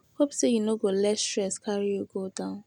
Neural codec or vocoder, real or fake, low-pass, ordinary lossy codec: none; real; 19.8 kHz; none